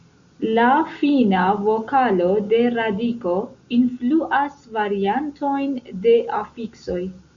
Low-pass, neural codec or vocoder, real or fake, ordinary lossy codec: 7.2 kHz; none; real; Opus, 64 kbps